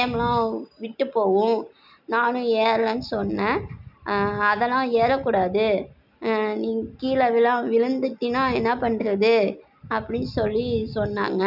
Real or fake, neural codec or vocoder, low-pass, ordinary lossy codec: real; none; 5.4 kHz; none